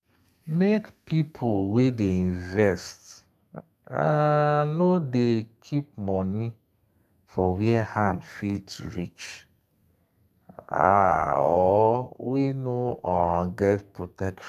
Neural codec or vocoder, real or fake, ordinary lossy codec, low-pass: codec, 32 kHz, 1.9 kbps, SNAC; fake; none; 14.4 kHz